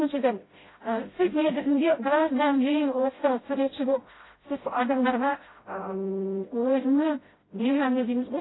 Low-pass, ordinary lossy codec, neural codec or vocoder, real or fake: 7.2 kHz; AAC, 16 kbps; codec, 16 kHz, 0.5 kbps, FreqCodec, smaller model; fake